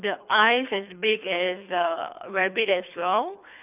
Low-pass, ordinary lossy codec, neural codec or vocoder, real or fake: 3.6 kHz; none; codec, 24 kHz, 3 kbps, HILCodec; fake